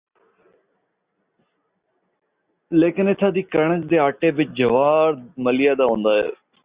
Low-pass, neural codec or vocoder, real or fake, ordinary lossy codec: 3.6 kHz; none; real; Opus, 64 kbps